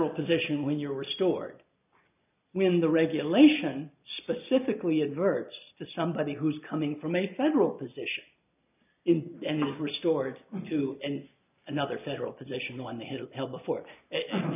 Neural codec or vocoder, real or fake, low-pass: none; real; 3.6 kHz